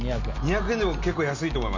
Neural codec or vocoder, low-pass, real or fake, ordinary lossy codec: none; 7.2 kHz; real; MP3, 64 kbps